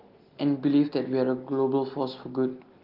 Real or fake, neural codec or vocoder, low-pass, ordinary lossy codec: real; none; 5.4 kHz; Opus, 32 kbps